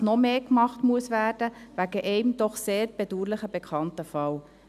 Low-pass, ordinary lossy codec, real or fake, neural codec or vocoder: 14.4 kHz; none; real; none